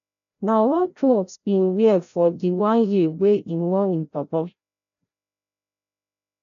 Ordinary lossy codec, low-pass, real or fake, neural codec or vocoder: none; 7.2 kHz; fake; codec, 16 kHz, 0.5 kbps, FreqCodec, larger model